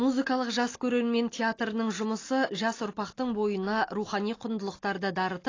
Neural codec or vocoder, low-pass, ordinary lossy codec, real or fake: none; 7.2 kHz; AAC, 32 kbps; real